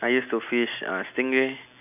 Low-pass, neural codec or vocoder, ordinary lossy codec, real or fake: 3.6 kHz; none; none; real